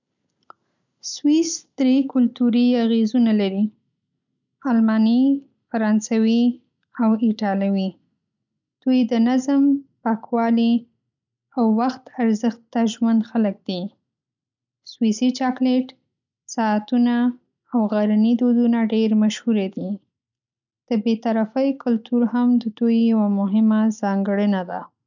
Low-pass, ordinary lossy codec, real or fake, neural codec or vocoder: 7.2 kHz; none; real; none